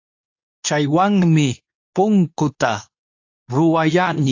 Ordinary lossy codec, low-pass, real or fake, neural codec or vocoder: AAC, 48 kbps; 7.2 kHz; fake; codec, 24 kHz, 0.9 kbps, WavTokenizer, medium speech release version 2